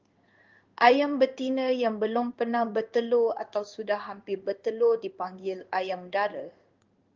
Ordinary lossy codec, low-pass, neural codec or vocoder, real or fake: Opus, 32 kbps; 7.2 kHz; codec, 16 kHz in and 24 kHz out, 1 kbps, XY-Tokenizer; fake